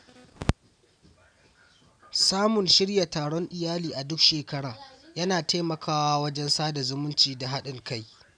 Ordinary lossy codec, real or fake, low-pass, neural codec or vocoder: none; real; 9.9 kHz; none